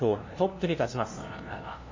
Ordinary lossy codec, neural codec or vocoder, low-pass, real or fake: MP3, 32 kbps; codec, 16 kHz, 0.5 kbps, FunCodec, trained on LibriTTS, 25 frames a second; 7.2 kHz; fake